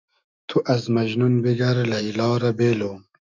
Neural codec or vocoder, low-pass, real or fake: autoencoder, 48 kHz, 128 numbers a frame, DAC-VAE, trained on Japanese speech; 7.2 kHz; fake